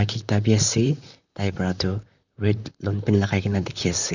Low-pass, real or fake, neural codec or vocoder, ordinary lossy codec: 7.2 kHz; fake; vocoder, 44.1 kHz, 128 mel bands, Pupu-Vocoder; none